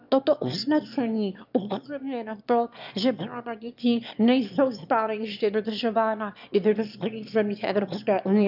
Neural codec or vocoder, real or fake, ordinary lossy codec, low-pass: autoencoder, 22.05 kHz, a latent of 192 numbers a frame, VITS, trained on one speaker; fake; none; 5.4 kHz